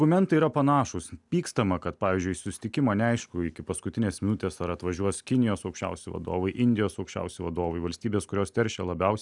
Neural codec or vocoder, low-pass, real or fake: none; 10.8 kHz; real